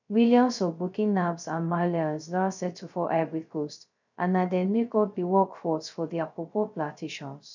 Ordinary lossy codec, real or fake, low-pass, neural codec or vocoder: none; fake; 7.2 kHz; codec, 16 kHz, 0.2 kbps, FocalCodec